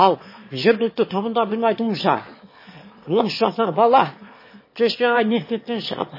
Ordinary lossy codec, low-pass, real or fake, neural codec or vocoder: MP3, 24 kbps; 5.4 kHz; fake; autoencoder, 22.05 kHz, a latent of 192 numbers a frame, VITS, trained on one speaker